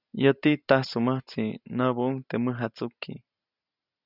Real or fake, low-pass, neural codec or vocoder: real; 5.4 kHz; none